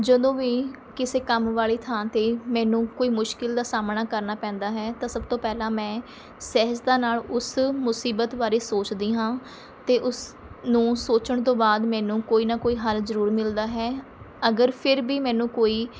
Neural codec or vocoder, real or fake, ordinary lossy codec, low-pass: none; real; none; none